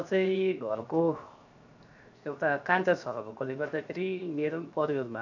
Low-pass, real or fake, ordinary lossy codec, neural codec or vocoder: 7.2 kHz; fake; none; codec, 16 kHz, 0.7 kbps, FocalCodec